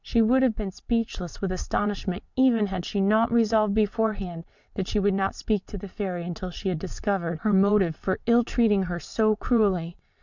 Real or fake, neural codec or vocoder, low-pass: fake; vocoder, 22.05 kHz, 80 mel bands, WaveNeXt; 7.2 kHz